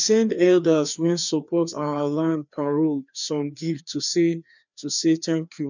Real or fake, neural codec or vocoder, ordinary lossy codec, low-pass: fake; codec, 16 kHz, 2 kbps, FreqCodec, larger model; none; 7.2 kHz